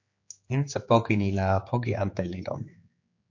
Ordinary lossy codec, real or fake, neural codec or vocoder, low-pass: MP3, 48 kbps; fake; codec, 16 kHz, 4 kbps, X-Codec, HuBERT features, trained on general audio; 7.2 kHz